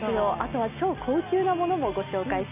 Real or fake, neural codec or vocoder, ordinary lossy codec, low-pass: real; none; none; 3.6 kHz